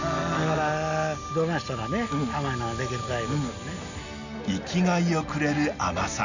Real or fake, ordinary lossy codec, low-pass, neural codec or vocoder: real; none; 7.2 kHz; none